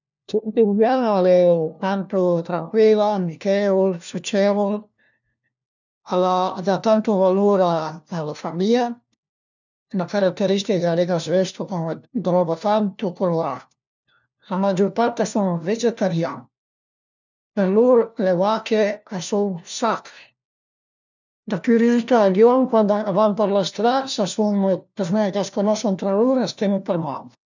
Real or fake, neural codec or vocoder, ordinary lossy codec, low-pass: fake; codec, 16 kHz, 1 kbps, FunCodec, trained on LibriTTS, 50 frames a second; none; 7.2 kHz